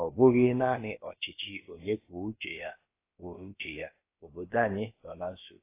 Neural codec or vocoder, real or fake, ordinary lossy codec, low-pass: codec, 16 kHz, about 1 kbps, DyCAST, with the encoder's durations; fake; AAC, 24 kbps; 3.6 kHz